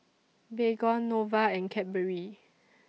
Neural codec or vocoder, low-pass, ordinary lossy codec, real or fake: none; none; none; real